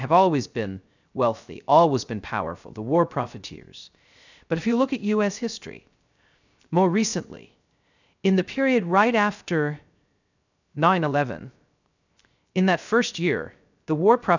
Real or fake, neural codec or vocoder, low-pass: fake; codec, 16 kHz, 0.3 kbps, FocalCodec; 7.2 kHz